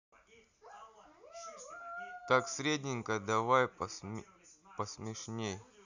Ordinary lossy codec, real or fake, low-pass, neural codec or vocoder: none; real; 7.2 kHz; none